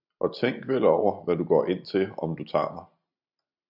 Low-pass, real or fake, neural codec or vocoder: 5.4 kHz; real; none